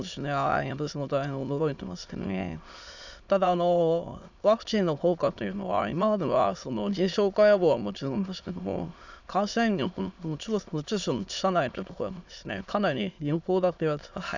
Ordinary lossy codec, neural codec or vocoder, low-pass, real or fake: none; autoencoder, 22.05 kHz, a latent of 192 numbers a frame, VITS, trained on many speakers; 7.2 kHz; fake